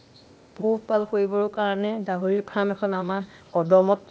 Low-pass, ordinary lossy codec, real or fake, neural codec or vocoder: none; none; fake; codec, 16 kHz, 0.8 kbps, ZipCodec